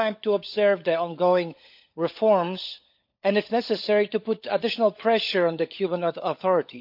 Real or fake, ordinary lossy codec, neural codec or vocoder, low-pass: fake; none; codec, 16 kHz, 16 kbps, FunCodec, trained on LibriTTS, 50 frames a second; 5.4 kHz